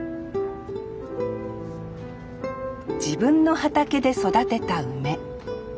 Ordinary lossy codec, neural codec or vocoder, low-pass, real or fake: none; none; none; real